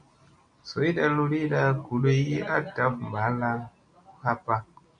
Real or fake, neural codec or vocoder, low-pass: real; none; 9.9 kHz